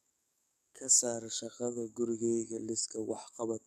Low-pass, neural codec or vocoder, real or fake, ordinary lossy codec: 14.4 kHz; codec, 44.1 kHz, 7.8 kbps, DAC; fake; none